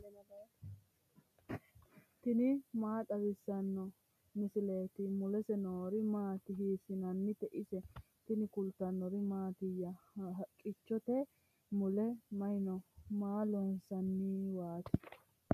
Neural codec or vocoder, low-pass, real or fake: none; 14.4 kHz; real